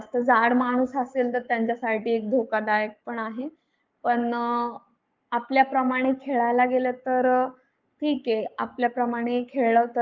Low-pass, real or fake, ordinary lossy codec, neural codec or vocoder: 7.2 kHz; fake; Opus, 24 kbps; codec, 44.1 kHz, 7.8 kbps, Pupu-Codec